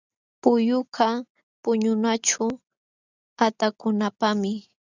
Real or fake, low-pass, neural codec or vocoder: real; 7.2 kHz; none